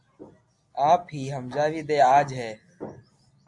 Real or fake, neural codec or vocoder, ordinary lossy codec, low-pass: real; none; MP3, 64 kbps; 10.8 kHz